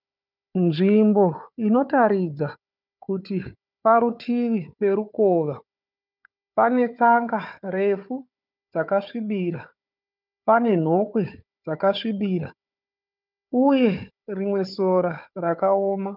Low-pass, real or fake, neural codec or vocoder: 5.4 kHz; fake; codec, 16 kHz, 4 kbps, FunCodec, trained on Chinese and English, 50 frames a second